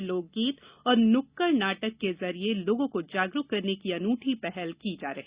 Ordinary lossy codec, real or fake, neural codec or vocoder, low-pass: Opus, 64 kbps; real; none; 3.6 kHz